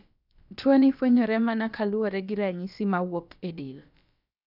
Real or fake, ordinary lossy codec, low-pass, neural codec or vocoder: fake; none; 5.4 kHz; codec, 16 kHz, about 1 kbps, DyCAST, with the encoder's durations